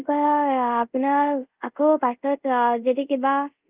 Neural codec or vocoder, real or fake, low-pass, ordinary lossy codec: codec, 24 kHz, 0.5 kbps, DualCodec; fake; 3.6 kHz; Opus, 24 kbps